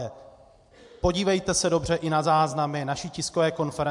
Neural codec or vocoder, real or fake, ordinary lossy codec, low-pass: none; real; MP3, 64 kbps; 10.8 kHz